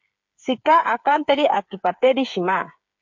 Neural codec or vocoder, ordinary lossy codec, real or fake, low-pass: codec, 16 kHz, 8 kbps, FreqCodec, smaller model; MP3, 48 kbps; fake; 7.2 kHz